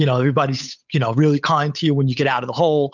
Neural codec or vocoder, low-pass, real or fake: codec, 16 kHz, 8 kbps, FunCodec, trained on Chinese and English, 25 frames a second; 7.2 kHz; fake